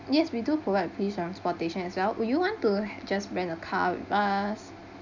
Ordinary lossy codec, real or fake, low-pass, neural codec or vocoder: none; real; 7.2 kHz; none